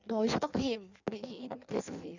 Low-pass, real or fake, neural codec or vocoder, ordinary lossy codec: 7.2 kHz; fake; codec, 16 kHz in and 24 kHz out, 1.1 kbps, FireRedTTS-2 codec; none